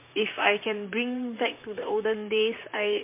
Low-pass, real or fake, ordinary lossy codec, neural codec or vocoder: 3.6 kHz; fake; MP3, 24 kbps; vocoder, 44.1 kHz, 128 mel bands, Pupu-Vocoder